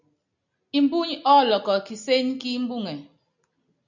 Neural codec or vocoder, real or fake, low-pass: none; real; 7.2 kHz